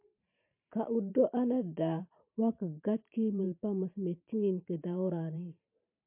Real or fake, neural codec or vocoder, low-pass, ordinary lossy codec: fake; vocoder, 44.1 kHz, 128 mel bands every 512 samples, BigVGAN v2; 3.6 kHz; MP3, 32 kbps